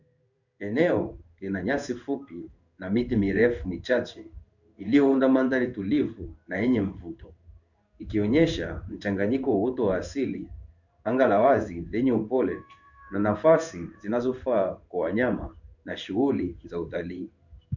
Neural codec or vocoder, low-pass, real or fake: codec, 16 kHz in and 24 kHz out, 1 kbps, XY-Tokenizer; 7.2 kHz; fake